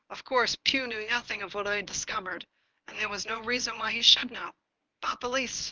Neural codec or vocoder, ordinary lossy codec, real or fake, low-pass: codec, 24 kHz, 0.9 kbps, WavTokenizer, medium speech release version 1; Opus, 24 kbps; fake; 7.2 kHz